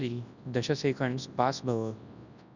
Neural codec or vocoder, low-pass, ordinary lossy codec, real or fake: codec, 24 kHz, 0.9 kbps, WavTokenizer, large speech release; 7.2 kHz; none; fake